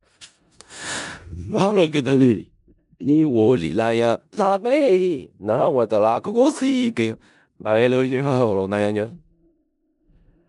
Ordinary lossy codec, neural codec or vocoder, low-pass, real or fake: none; codec, 16 kHz in and 24 kHz out, 0.4 kbps, LongCat-Audio-Codec, four codebook decoder; 10.8 kHz; fake